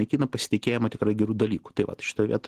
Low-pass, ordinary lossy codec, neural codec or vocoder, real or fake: 14.4 kHz; Opus, 16 kbps; none; real